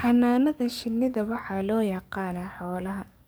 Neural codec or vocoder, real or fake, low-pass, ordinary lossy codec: codec, 44.1 kHz, 7.8 kbps, DAC; fake; none; none